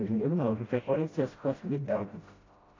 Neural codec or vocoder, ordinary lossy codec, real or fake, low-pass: codec, 16 kHz, 0.5 kbps, FreqCodec, smaller model; AAC, 32 kbps; fake; 7.2 kHz